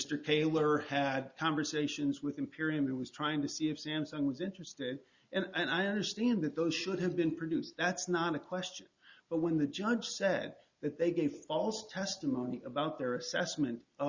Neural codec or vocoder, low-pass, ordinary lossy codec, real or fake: none; 7.2 kHz; Opus, 64 kbps; real